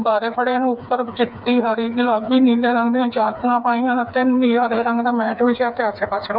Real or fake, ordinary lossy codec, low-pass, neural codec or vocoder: fake; none; 5.4 kHz; codec, 16 kHz, 4 kbps, FreqCodec, smaller model